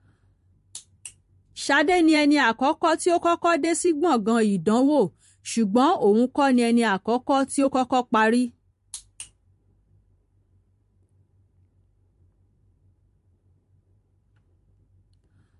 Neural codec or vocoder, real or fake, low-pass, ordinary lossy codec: vocoder, 44.1 kHz, 128 mel bands every 512 samples, BigVGAN v2; fake; 14.4 kHz; MP3, 48 kbps